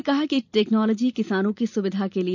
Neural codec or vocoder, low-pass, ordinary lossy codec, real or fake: vocoder, 44.1 kHz, 128 mel bands every 256 samples, BigVGAN v2; 7.2 kHz; none; fake